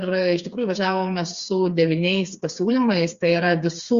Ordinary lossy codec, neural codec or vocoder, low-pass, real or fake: Opus, 64 kbps; codec, 16 kHz, 4 kbps, FreqCodec, smaller model; 7.2 kHz; fake